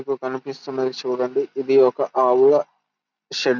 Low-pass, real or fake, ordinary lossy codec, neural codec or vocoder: 7.2 kHz; real; none; none